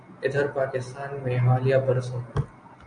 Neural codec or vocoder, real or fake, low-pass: none; real; 9.9 kHz